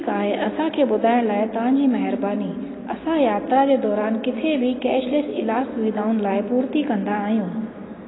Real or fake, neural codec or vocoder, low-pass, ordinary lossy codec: real; none; 7.2 kHz; AAC, 16 kbps